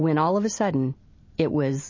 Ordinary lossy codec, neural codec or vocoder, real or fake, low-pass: MP3, 32 kbps; none; real; 7.2 kHz